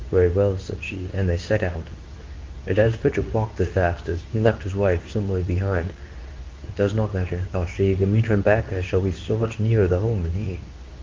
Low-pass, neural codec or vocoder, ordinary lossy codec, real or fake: 7.2 kHz; codec, 24 kHz, 0.9 kbps, WavTokenizer, medium speech release version 2; Opus, 24 kbps; fake